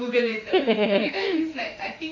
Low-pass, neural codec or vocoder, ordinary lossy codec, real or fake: 7.2 kHz; autoencoder, 48 kHz, 32 numbers a frame, DAC-VAE, trained on Japanese speech; AAC, 48 kbps; fake